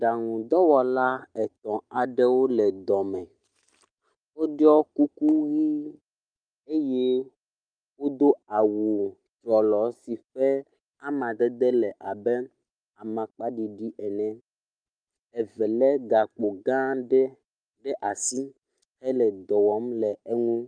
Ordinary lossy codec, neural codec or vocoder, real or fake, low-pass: Opus, 32 kbps; none; real; 9.9 kHz